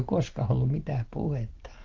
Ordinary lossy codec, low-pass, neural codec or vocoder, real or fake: Opus, 16 kbps; 7.2 kHz; none; real